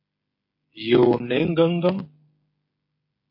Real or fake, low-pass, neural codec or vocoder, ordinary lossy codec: fake; 5.4 kHz; codec, 16 kHz, 16 kbps, FreqCodec, smaller model; MP3, 32 kbps